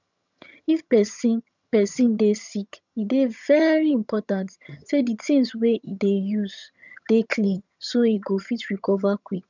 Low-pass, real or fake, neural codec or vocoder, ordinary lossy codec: 7.2 kHz; fake; vocoder, 22.05 kHz, 80 mel bands, HiFi-GAN; none